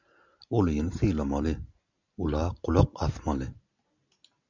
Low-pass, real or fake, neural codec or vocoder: 7.2 kHz; real; none